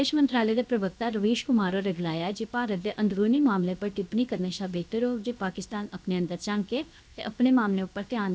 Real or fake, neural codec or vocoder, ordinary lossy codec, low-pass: fake; codec, 16 kHz, 0.7 kbps, FocalCodec; none; none